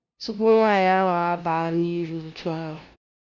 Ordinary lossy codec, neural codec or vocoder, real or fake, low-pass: Opus, 64 kbps; codec, 16 kHz, 0.5 kbps, FunCodec, trained on LibriTTS, 25 frames a second; fake; 7.2 kHz